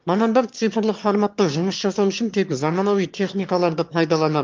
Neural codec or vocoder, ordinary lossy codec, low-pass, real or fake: autoencoder, 22.05 kHz, a latent of 192 numbers a frame, VITS, trained on one speaker; Opus, 24 kbps; 7.2 kHz; fake